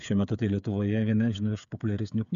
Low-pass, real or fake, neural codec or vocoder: 7.2 kHz; fake; codec, 16 kHz, 8 kbps, FreqCodec, smaller model